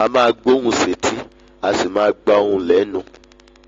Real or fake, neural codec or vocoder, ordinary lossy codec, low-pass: real; none; AAC, 32 kbps; 7.2 kHz